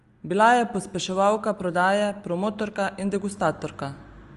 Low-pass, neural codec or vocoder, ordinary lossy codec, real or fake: 10.8 kHz; none; Opus, 32 kbps; real